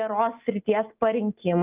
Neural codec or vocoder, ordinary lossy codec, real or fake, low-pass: none; Opus, 32 kbps; real; 3.6 kHz